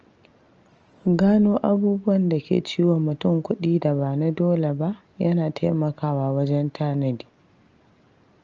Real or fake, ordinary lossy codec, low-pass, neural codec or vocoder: real; Opus, 24 kbps; 7.2 kHz; none